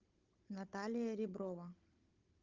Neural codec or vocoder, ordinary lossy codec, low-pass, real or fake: codec, 16 kHz, 16 kbps, FunCodec, trained on Chinese and English, 50 frames a second; Opus, 32 kbps; 7.2 kHz; fake